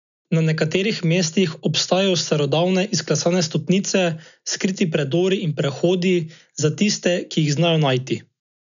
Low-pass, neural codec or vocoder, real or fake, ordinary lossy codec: 7.2 kHz; none; real; none